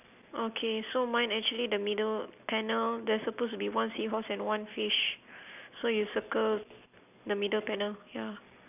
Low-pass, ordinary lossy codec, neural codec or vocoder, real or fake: 3.6 kHz; none; none; real